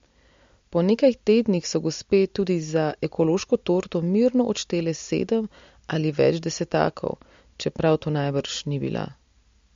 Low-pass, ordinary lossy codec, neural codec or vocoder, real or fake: 7.2 kHz; MP3, 48 kbps; none; real